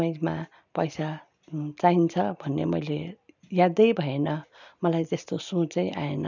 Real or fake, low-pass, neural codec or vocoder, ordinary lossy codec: real; 7.2 kHz; none; none